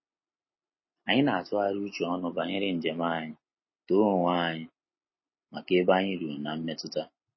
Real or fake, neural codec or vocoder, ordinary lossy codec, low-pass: real; none; MP3, 24 kbps; 7.2 kHz